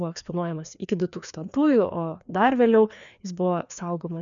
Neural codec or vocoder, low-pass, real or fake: codec, 16 kHz, 2 kbps, FreqCodec, larger model; 7.2 kHz; fake